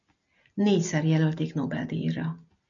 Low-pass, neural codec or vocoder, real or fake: 7.2 kHz; none; real